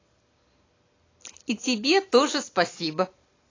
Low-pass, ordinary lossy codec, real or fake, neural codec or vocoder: 7.2 kHz; AAC, 32 kbps; real; none